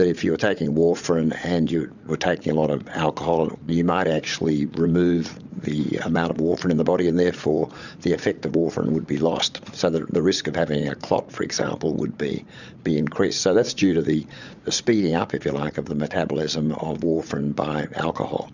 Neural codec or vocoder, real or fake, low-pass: vocoder, 44.1 kHz, 80 mel bands, Vocos; fake; 7.2 kHz